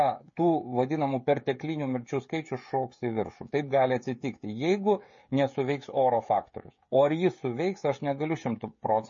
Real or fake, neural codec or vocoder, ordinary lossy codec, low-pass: fake; codec, 16 kHz, 16 kbps, FreqCodec, smaller model; MP3, 32 kbps; 7.2 kHz